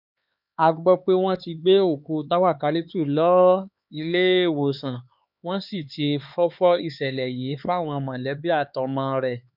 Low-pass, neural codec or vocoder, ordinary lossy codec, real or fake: 5.4 kHz; codec, 16 kHz, 4 kbps, X-Codec, HuBERT features, trained on LibriSpeech; none; fake